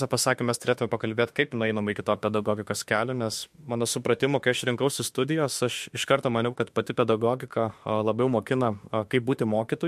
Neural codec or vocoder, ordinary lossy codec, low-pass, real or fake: autoencoder, 48 kHz, 32 numbers a frame, DAC-VAE, trained on Japanese speech; MP3, 64 kbps; 14.4 kHz; fake